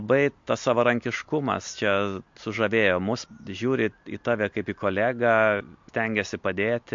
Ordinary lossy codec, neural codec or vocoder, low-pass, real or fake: MP3, 48 kbps; none; 7.2 kHz; real